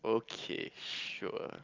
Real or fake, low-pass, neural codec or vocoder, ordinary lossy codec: real; 7.2 kHz; none; Opus, 24 kbps